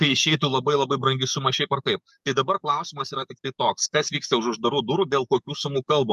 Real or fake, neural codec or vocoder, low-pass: fake; codec, 44.1 kHz, 7.8 kbps, DAC; 14.4 kHz